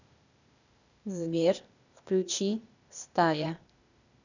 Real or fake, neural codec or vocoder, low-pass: fake; codec, 16 kHz, 0.8 kbps, ZipCodec; 7.2 kHz